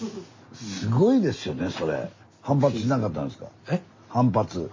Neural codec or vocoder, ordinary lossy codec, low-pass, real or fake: none; MP3, 32 kbps; 7.2 kHz; real